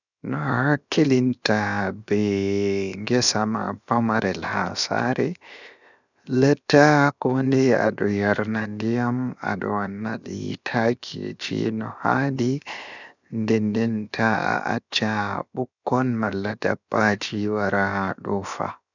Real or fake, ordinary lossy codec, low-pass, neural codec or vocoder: fake; none; 7.2 kHz; codec, 16 kHz, 0.7 kbps, FocalCodec